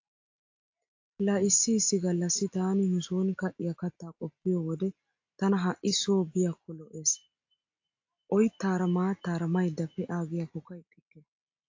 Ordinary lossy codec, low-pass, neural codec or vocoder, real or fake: AAC, 48 kbps; 7.2 kHz; none; real